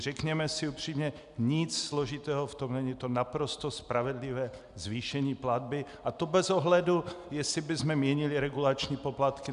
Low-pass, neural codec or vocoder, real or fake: 10.8 kHz; none; real